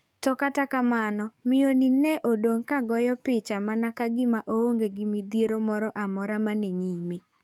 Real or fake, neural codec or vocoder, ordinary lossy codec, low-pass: fake; codec, 44.1 kHz, 7.8 kbps, DAC; none; 19.8 kHz